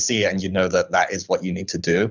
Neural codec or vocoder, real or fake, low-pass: codec, 24 kHz, 6 kbps, HILCodec; fake; 7.2 kHz